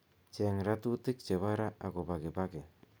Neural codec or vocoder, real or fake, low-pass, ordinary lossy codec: none; real; none; none